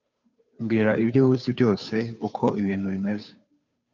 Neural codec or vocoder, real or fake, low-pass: codec, 16 kHz, 2 kbps, FunCodec, trained on Chinese and English, 25 frames a second; fake; 7.2 kHz